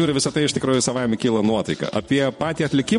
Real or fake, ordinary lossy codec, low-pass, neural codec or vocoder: fake; MP3, 48 kbps; 10.8 kHz; vocoder, 24 kHz, 100 mel bands, Vocos